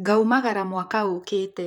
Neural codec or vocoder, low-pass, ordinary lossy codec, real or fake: vocoder, 44.1 kHz, 128 mel bands, Pupu-Vocoder; 19.8 kHz; none; fake